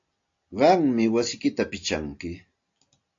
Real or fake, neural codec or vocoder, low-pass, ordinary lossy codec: real; none; 7.2 kHz; AAC, 48 kbps